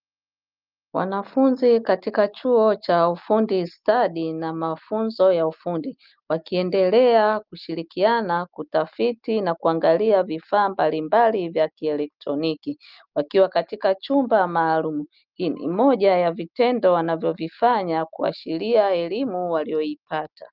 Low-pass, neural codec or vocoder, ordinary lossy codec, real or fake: 5.4 kHz; none; Opus, 24 kbps; real